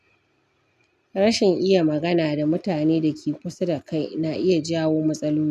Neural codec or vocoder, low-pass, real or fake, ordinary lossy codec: none; 14.4 kHz; real; none